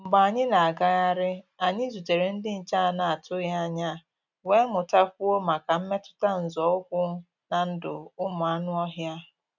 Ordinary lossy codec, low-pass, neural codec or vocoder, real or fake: none; 7.2 kHz; none; real